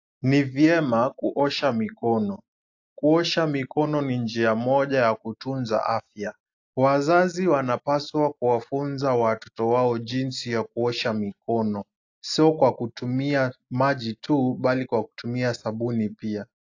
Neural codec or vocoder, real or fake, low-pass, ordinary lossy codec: none; real; 7.2 kHz; AAC, 48 kbps